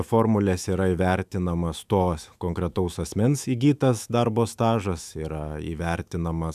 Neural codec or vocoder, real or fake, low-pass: autoencoder, 48 kHz, 128 numbers a frame, DAC-VAE, trained on Japanese speech; fake; 14.4 kHz